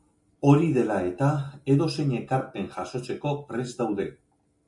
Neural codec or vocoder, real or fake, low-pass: none; real; 10.8 kHz